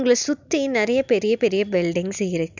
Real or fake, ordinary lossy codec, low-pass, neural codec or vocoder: real; none; 7.2 kHz; none